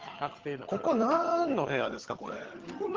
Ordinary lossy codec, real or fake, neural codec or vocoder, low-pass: Opus, 16 kbps; fake; vocoder, 22.05 kHz, 80 mel bands, HiFi-GAN; 7.2 kHz